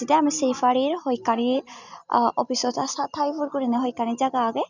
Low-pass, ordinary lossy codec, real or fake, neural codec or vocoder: 7.2 kHz; none; real; none